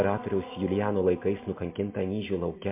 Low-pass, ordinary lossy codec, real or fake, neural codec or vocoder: 3.6 kHz; MP3, 24 kbps; real; none